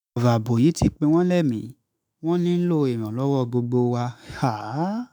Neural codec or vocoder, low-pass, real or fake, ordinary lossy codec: autoencoder, 48 kHz, 128 numbers a frame, DAC-VAE, trained on Japanese speech; none; fake; none